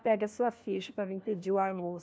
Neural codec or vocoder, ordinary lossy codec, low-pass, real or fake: codec, 16 kHz, 1 kbps, FunCodec, trained on LibriTTS, 50 frames a second; none; none; fake